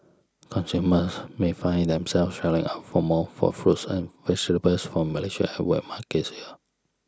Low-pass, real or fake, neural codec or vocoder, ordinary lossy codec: none; real; none; none